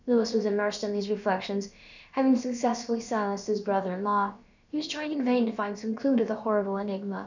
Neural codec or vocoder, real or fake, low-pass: codec, 16 kHz, about 1 kbps, DyCAST, with the encoder's durations; fake; 7.2 kHz